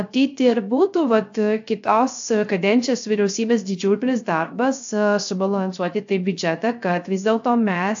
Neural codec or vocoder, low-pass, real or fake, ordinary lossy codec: codec, 16 kHz, 0.3 kbps, FocalCodec; 7.2 kHz; fake; AAC, 64 kbps